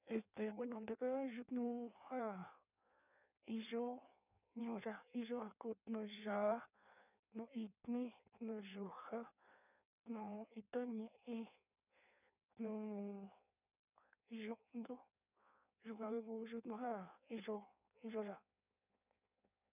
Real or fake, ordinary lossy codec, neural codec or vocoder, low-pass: fake; none; codec, 16 kHz in and 24 kHz out, 1.1 kbps, FireRedTTS-2 codec; 3.6 kHz